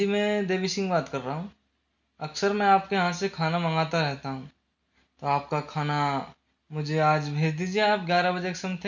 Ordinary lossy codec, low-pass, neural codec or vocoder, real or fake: none; 7.2 kHz; none; real